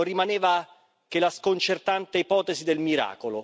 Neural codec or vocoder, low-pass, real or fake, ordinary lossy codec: none; none; real; none